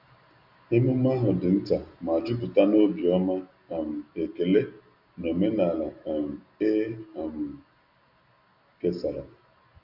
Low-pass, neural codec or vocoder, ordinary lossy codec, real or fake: 5.4 kHz; none; none; real